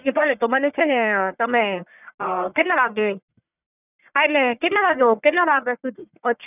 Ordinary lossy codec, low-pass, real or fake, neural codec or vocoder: none; 3.6 kHz; fake; codec, 44.1 kHz, 1.7 kbps, Pupu-Codec